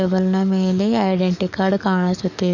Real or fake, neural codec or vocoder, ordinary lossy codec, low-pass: fake; codec, 44.1 kHz, 7.8 kbps, DAC; none; 7.2 kHz